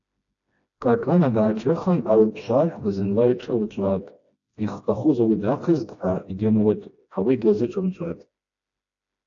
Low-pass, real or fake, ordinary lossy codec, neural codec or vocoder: 7.2 kHz; fake; AAC, 48 kbps; codec, 16 kHz, 1 kbps, FreqCodec, smaller model